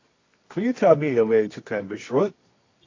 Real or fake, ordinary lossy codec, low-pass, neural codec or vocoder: fake; AAC, 32 kbps; 7.2 kHz; codec, 24 kHz, 0.9 kbps, WavTokenizer, medium music audio release